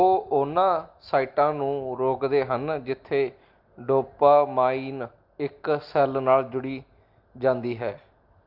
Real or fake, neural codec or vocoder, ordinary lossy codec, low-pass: real; none; Opus, 32 kbps; 5.4 kHz